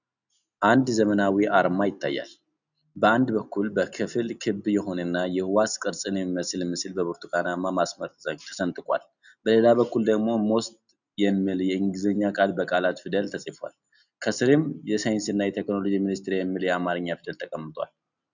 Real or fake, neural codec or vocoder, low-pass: real; none; 7.2 kHz